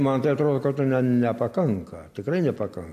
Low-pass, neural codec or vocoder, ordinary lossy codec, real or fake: 14.4 kHz; none; MP3, 64 kbps; real